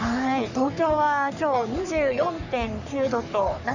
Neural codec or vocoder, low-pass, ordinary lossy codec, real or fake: codec, 44.1 kHz, 3.4 kbps, Pupu-Codec; 7.2 kHz; none; fake